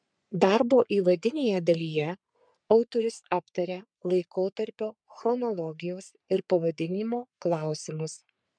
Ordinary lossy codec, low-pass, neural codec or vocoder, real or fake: MP3, 96 kbps; 9.9 kHz; codec, 44.1 kHz, 3.4 kbps, Pupu-Codec; fake